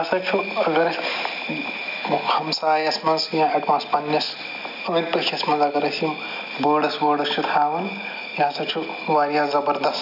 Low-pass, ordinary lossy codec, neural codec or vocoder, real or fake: 5.4 kHz; none; none; real